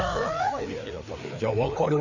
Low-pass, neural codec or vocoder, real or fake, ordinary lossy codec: 7.2 kHz; codec, 16 kHz, 4 kbps, FreqCodec, larger model; fake; none